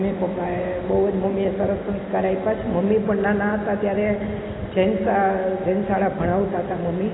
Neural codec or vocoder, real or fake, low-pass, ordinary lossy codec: none; real; 7.2 kHz; AAC, 16 kbps